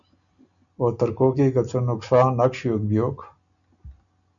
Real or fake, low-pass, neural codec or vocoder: real; 7.2 kHz; none